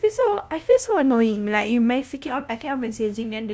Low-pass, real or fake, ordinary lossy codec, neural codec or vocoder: none; fake; none; codec, 16 kHz, 0.5 kbps, FunCodec, trained on LibriTTS, 25 frames a second